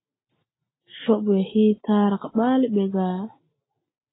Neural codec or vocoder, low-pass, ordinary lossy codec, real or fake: none; 7.2 kHz; AAC, 16 kbps; real